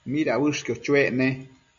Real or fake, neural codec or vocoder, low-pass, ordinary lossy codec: real; none; 7.2 kHz; MP3, 64 kbps